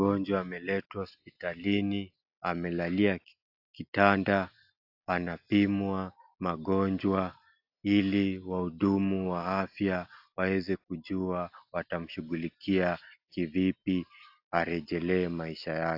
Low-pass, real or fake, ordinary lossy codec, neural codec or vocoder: 5.4 kHz; real; AAC, 48 kbps; none